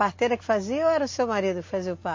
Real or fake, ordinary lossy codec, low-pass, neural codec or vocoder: real; MP3, 32 kbps; 7.2 kHz; none